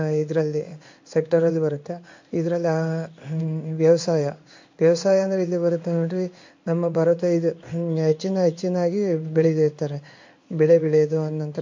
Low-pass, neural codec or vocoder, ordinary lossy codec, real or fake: 7.2 kHz; codec, 16 kHz in and 24 kHz out, 1 kbps, XY-Tokenizer; MP3, 48 kbps; fake